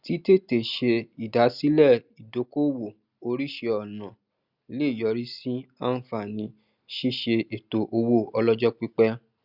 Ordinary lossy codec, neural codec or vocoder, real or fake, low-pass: Opus, 64 kbps; none; real; 5.4 kHz